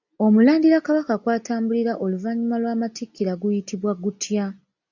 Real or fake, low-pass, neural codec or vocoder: real; 7.2 kHz; none